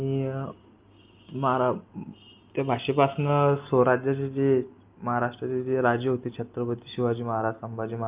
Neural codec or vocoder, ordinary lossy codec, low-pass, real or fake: none; Opus, 24 kbps; 3.6 kHz; real